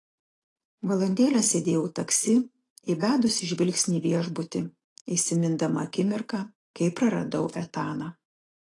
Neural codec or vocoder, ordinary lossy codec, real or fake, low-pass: none; AAC, 32 kbps; real; 10.8 kHz